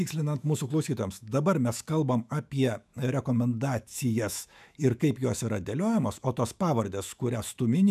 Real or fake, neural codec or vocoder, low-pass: fake; autoencoder, 48 kHz, 128 numbers a frame, DAC-VAE, trained on Japanese speech; 14.4 kHz